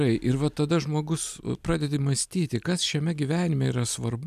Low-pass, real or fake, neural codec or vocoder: 14.4 kHz; real; none